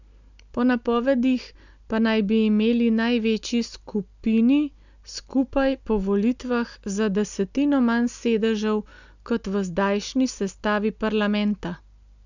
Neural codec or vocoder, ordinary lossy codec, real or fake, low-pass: none; none; real; 7.2 kHz